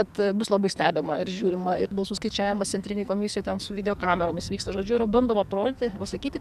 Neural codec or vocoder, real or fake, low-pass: codec, 44.1 kHz, 2.6 kbps, SNAC; fake; 14.4 kHz